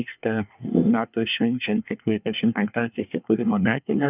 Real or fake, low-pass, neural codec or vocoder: fake; 3.6 kHz; codec, 24 kHz, 1 kbps, SNAC